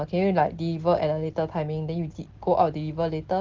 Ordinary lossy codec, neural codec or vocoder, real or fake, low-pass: Opus, 16 kbps; none; real; 7.2 kHz